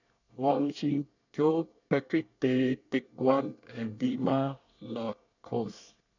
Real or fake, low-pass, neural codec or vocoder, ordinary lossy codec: fake; 7.2 kHz; codec, 24 kHz, 1 kbps, SNAC; none